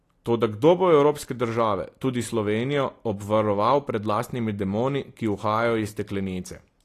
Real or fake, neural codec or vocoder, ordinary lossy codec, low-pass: real; none; AAC, 48 kbps; 14.4 kHz